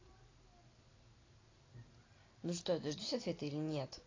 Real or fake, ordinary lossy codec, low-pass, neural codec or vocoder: real; AAC, 32 kbps; 7.2 kHz; none